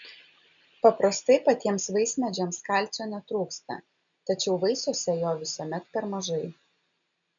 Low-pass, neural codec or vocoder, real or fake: 7.2 kHz; none; real